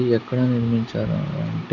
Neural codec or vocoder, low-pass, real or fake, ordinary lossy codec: none; 7.2 kHz; real; none